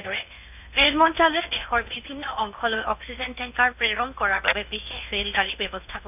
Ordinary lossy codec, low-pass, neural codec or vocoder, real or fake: none; 3.6 kHz; codec, 16 kHz in and 24 kHz out, 0.8 kbps, FocalCodec, streaming, 65536 codes; fake